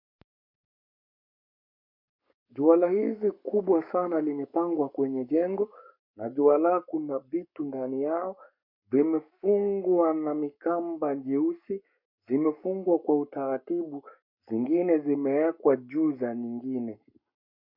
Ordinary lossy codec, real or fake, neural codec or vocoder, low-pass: MP3, 48 kbps; fake; codec, 44.1 kHz, 7.8 kbps, Pupu-Codec; 5.4 kHz